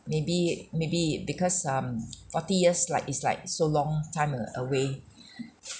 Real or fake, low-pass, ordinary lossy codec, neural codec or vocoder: real; none; none; none